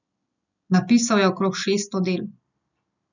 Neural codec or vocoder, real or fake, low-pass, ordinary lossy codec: none; real; 7.2 kHz; none